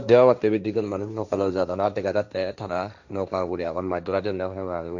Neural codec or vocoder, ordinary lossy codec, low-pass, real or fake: codec, 16 kHz, 1.1 kbps, Voila-Tokenizer; none; 7.2 kHz; fake